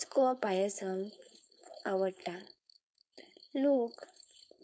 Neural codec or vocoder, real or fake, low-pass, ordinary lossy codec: codec, 16 kHz, 4.8 kbps, FACodec; fake; none; none